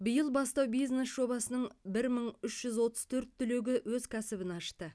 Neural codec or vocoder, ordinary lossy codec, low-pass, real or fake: none; none; none; real